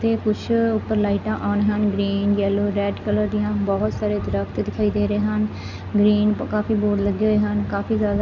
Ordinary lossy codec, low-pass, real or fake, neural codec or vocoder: none; 7.2 kHz; real; none